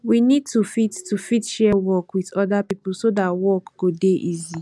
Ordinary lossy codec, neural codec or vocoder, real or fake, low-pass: none; none; real; none